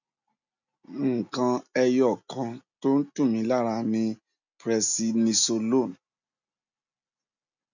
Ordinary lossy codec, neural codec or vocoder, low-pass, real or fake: none; none; 7.2 kHz; real